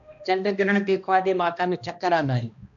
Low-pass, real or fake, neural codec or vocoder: 7.2 kHz; fake; codec, 16 kHz, 1 kbps, X-Codec, HuBERT features, trained on balanced general audio